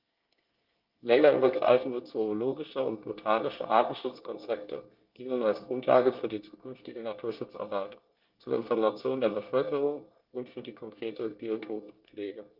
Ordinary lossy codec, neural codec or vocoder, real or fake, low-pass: Opus, 32 kbps; codec, 24 kHz, 1 kbps, SNAC; fake; 5.4 kHz